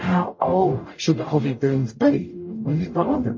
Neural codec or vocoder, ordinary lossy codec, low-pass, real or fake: codec, 44.1 kHz, 0.9 kbps, DAC; MP3, 32 kbps; 7.2 kHz; fake